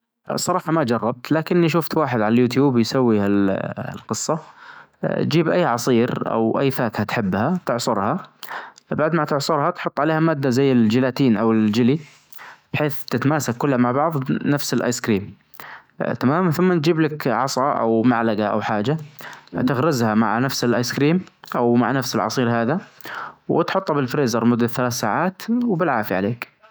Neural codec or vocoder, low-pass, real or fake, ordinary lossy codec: autoencoder, 48 kHz, 128 numbers a frame, DAC-VAE, trained on Japanese speech; none; fake; none